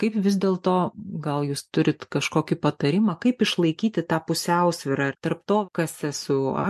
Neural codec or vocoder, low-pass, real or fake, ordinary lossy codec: none; 14.4 kHz; real; MP3, 64 kbps